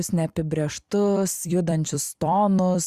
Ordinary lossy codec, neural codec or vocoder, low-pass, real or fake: Opus, 64 kbps; vocoder, 44.1 kHz, 128 mel bands every 256 samples, BigVGAN v2; 14.4 kHz; fake